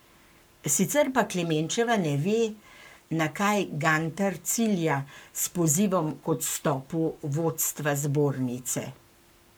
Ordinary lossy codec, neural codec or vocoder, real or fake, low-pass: none; codec, 44.1 kHz, 7.8 kbps, Pupu-Codec; fake; none